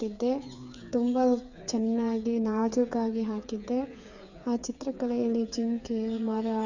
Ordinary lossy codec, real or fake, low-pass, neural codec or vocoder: none; fake; 7.2 kHz; codec, 16 kHz, 8 kbps, FreqCodec, smaller model